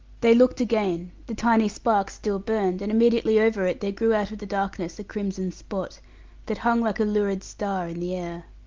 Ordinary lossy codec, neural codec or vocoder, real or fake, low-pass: Opus, 32 kbps; none; real; 7.2 kHz